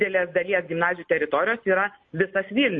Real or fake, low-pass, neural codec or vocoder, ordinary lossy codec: real; 7.2 kHz; none; MP3, 32 kbps